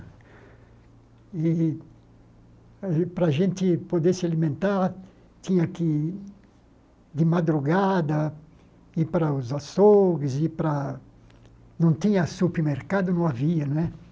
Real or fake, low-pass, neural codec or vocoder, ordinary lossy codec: real; none; none; none